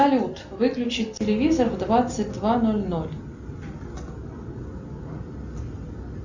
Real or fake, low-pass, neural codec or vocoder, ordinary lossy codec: real; 7.2 kHz; none; Opus, 64 kbps